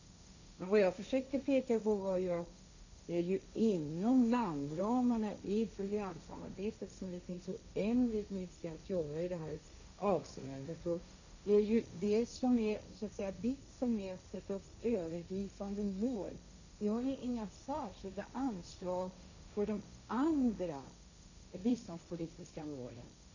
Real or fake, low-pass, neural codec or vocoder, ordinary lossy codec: fake; 7.2 kHz; codec, 16 kHz, 1.1 kbps, Voila-Tokenizer; none